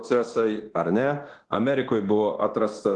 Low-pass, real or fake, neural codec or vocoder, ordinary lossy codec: 10.8 kHz; fake; codec, 24 kHz, 0.9 kbps, DualCodec; Opus, 16 kbps